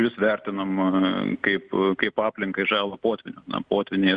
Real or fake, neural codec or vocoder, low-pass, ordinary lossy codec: real; none; 9.9 kHz; Opus, 64 kbps